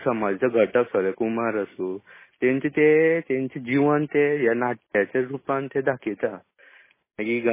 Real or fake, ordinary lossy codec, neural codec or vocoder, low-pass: real; MP3, 16 kbps; none; 3.6 kHz